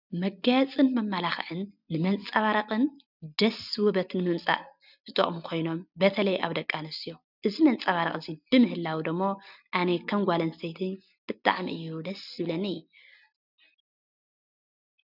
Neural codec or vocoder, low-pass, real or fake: none; 5.4 kHz; real